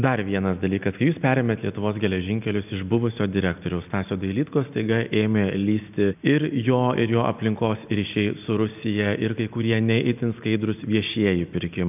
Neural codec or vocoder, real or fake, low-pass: none; real; 3.6 kHz